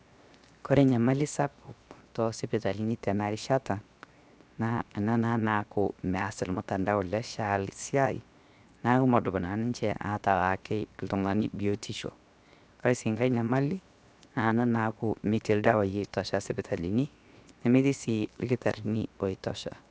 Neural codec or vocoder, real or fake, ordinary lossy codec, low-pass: codec, 16 kHz, 0.7 kbps, FocalCodec; fake; none; none